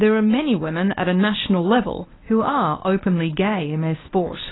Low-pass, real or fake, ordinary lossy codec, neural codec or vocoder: 7.2 kHz; fake; AAC, 16 kbps; codec, 24 kHz, 0.9 kbps, WavTokenizer, medium speech release version 1